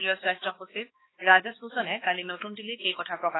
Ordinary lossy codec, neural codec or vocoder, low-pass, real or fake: AAC, 16 kbps; codec, 16 kHz, 2 kbps, X-Codec, HuBERT features, trained on balanced general audio; 7.2 kHz; fake